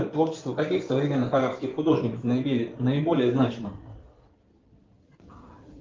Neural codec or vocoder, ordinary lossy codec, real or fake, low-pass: codec, 16 kHz in and 24 kHz out, 2.2 kbps, FireRedTTS-2 codec; Opus, 32 kbps; fake; 7.2 kHz